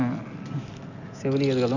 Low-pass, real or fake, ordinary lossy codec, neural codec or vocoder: 7.2 kHz; real; none; none